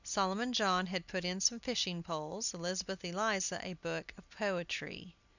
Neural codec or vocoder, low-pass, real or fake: none; 7.2 kHz; real